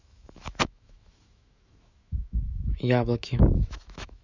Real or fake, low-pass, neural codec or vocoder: fake; 7.2 kHz; autoencoder, 48 kHz, 128 numbers a frame, DAC-VAE, trained on Japanese speech